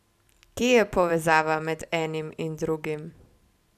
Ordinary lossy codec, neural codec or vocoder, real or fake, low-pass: none; vocoder, 44.1 kHz, 128 mel bands every 256 samples, BigVGAN v2; fake; 14.4 kHz